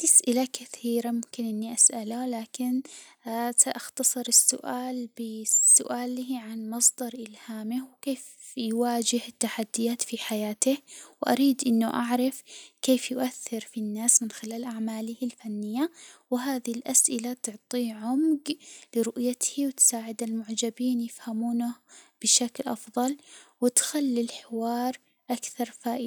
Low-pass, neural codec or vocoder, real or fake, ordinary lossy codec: none; none; real; none